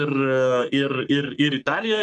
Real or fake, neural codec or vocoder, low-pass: fake; codec, 44.1 kHz, 3.4 kbps, Pupu-Codec; 10.8 kHz